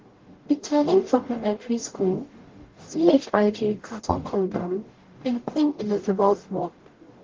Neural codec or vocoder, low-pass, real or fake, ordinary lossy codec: codec, 44.1 kHz, 0.9 kbps, DAC; 7.2 kHz; fake; Opus, 16 kbps